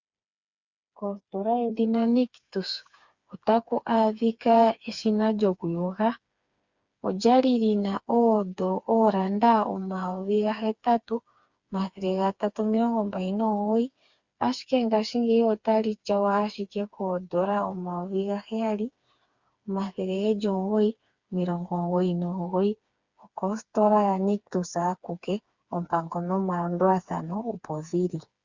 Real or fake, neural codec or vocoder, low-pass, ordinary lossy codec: fake; codec, 16 kHz, 4 kbps, FreqCodec, smaller model; 7.2 kHz; Opus, 64 kbps